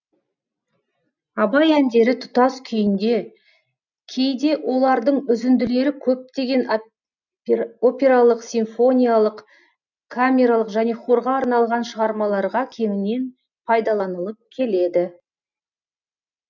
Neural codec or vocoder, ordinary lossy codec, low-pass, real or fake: none; none; 7.2 kHz; real